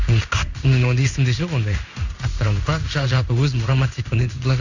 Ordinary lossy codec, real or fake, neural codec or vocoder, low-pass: none; fake; codec, 16 kHz in and 24 kHz out, 1 kbps, XY-Tokenizer; 7.2 kHz